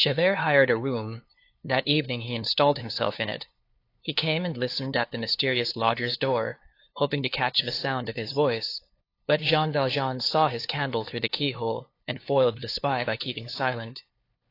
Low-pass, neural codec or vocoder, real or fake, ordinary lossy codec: 5.4 kHz; codec, 16 kHz, 2 kbps, FunCodec, trained on LibriTTS, 25 frames a second; fake; AAC, 32 kbps